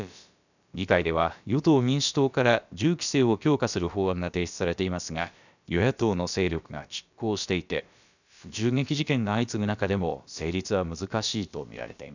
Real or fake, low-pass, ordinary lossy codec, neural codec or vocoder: fake; 7.2 kHz; none; codec, 16 kHz, about 1 kbps, DyCAST, with the encoder's durations